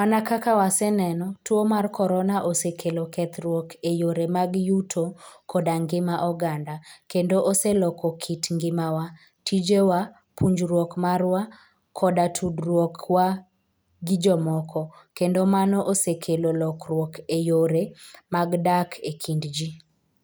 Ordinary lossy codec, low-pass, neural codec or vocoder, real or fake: none; none; none; real